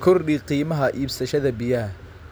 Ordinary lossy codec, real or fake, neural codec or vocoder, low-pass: none; real; none; none